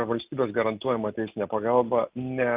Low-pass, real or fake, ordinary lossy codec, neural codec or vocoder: 3.6 kHz; real; Opus, 32 kbps; none